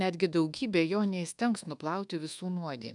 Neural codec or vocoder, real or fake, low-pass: codec, 24 kHz, 1.2 kbps, DualCodec; fake; 10.8 kHz